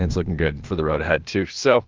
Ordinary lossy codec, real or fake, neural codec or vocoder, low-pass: Opus, 24 kbps; fake; codec, 16 kHz, 0.8 kbps, ZipCodec; 7.2 kHz